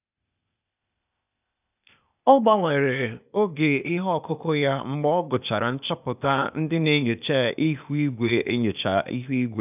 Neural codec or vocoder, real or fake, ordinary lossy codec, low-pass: codec, 16 kHz, 0.8 kbps, ZipCodec; fake; none; 3.6 kHz